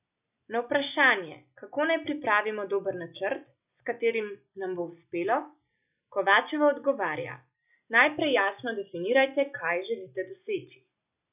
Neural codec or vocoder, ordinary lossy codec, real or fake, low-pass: none; none; real; 3.6 kHz